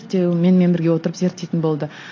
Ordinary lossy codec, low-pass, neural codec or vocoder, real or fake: AAC, 32 kbps; 7.2 kHz; none; real